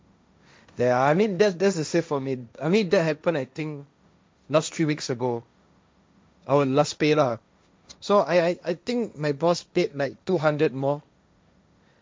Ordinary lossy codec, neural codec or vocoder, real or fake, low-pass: none; codec, 16 kHz, 1.1 kbps, Voila-Tokenizer; fake; none